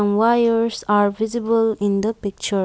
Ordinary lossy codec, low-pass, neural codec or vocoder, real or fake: none; none; none; real